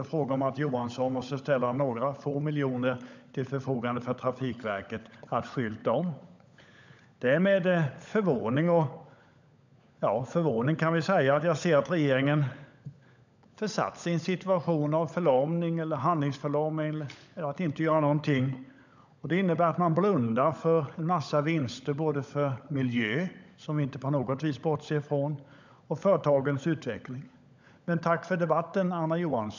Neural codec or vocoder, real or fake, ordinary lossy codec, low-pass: codec, 16 kHz, 16 kbps, FunCodec, trained on LibriTTS, 50 frames a second; fake; none; 7.2 kHz